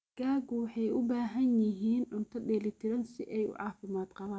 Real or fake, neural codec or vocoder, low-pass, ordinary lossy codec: real; none; none; none